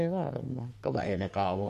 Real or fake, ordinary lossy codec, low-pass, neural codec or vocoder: fake; MP3, 64 kbps; 14.4 kHz; codec, 44.1 kHz, 3.4 kbps, Pupu-Codec